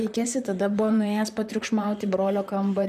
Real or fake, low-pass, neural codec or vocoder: fake; 14.4 kHz; vocoder, 44.1 kHz, 128 mel bands, Pupu-Vocoder